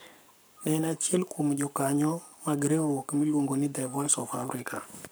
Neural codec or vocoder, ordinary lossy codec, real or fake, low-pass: codec, 44.1 kHz, 7.8 kbps, Pupu-Codec; none; fake; none